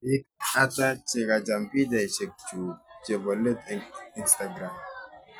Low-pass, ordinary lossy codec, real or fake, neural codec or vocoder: none; none; real; none